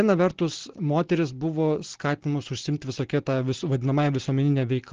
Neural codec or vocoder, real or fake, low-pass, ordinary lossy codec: none; real; 7.2 kHz; Opus, 16 kbps